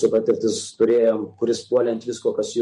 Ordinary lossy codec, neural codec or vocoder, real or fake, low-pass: MP3, 48 kbps; vocoder, 44.1 kHz, 128 mel bands every 512 samples, BigVGAN v2; fake; 14.4 kHz